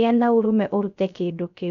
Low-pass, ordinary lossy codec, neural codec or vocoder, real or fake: 7.2 kHz; none; codec, 16 kHz, 0.3 kbps, FocalCodec; fake